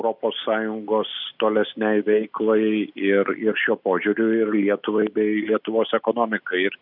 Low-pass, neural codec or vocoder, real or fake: 5.4 kHz; none; real